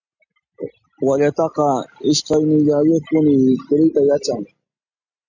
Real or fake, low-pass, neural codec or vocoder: real; 7.2 kHz; none